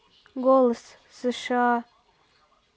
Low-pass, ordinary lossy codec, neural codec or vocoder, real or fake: none; none; none; real